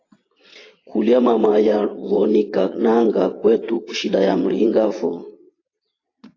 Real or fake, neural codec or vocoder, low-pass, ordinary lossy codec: fake; vocoder, 22.05 kHz, 80 mel bands, WaveNeXt; 7.2 kHz; AAC, 32 kbps